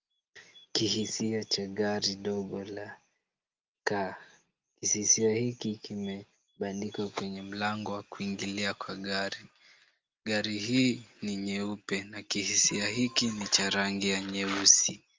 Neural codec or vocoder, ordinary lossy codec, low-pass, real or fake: none; Opus, 32 kbps; 7.2 kHz; real